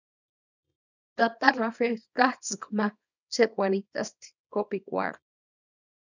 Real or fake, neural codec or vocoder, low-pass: fake; codec, 24 kHz, 0.9 kbps, WavTokenizer, small release; 7.2 kHz